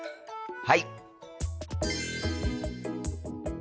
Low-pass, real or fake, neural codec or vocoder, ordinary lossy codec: none; real; none; none